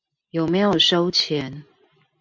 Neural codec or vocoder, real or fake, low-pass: none; real; 7.2 kHz